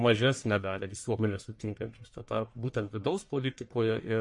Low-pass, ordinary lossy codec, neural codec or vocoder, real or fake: 10.8 kHz; MP3, 48 kbps; codec, 44.1 kHz, 1.7 kbps, Pupu-Codec; fake